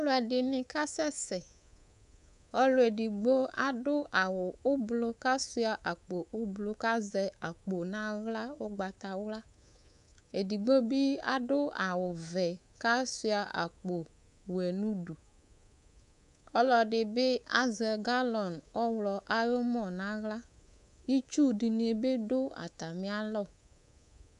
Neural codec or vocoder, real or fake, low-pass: codec, 24 kHz, 3.1 kbps, DualCodec; fake; 10.8 kHz